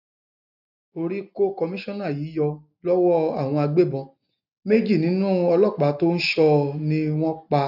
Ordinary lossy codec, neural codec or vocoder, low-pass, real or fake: none; none; 5.4 kHz; real